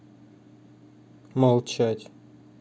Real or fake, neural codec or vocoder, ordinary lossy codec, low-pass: real; none; none; none